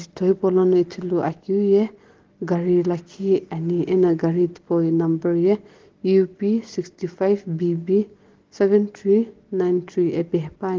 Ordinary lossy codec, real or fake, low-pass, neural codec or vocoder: Opus, 16 kbps; real; 7.2 kHz; none